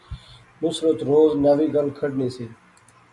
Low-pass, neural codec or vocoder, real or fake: 10.8 kHz; none; real